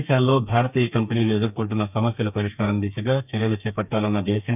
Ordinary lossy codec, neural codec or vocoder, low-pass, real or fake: none; codec, 32 kHz, 1.9 kbps, SNAC; 3.6 kHz; fake